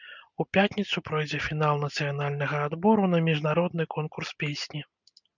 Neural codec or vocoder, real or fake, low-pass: none; real; 7.2 kHz